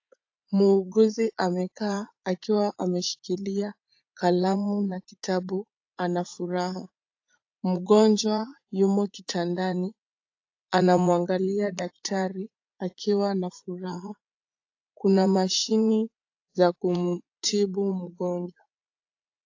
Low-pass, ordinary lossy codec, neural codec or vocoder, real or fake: 7.2 kHz; AAC, 48 kbps; vocoder, 44.1 kHz, 80 mel bands, Vocos; fake